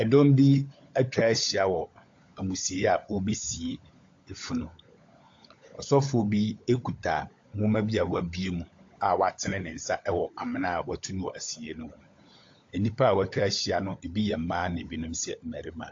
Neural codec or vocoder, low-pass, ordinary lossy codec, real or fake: codec, 16 kHz, 16 kbps, FunCodec, trained on LibriTTS, 50 frames a second; 7.2 kHz; AAC, 48 kbps; fake